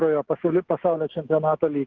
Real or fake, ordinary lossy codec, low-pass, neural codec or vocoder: fake; Opus, 16 kbps; 7.2 kHz; autoencoder, 48 kHz, 128 numbers a frame, DAC-VAE, trained on Japanese speech